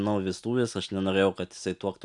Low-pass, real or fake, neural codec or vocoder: 10.8 kHz; real; none